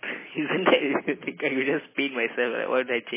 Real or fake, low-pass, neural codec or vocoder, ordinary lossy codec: real; 3.6 kHz; none; MP3, 16 kbps